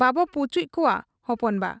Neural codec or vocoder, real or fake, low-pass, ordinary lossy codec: none; real; none; none